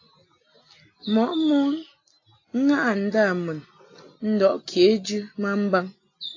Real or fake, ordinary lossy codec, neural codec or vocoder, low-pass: real; AAC, 32 kbps; none; 7.2 kHz